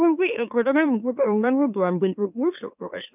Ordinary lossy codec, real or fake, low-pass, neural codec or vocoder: none; fake; 3.6 kHz; autoencoder, 44.1 kHz, a latent of 192 numbers a frame, MeloTTS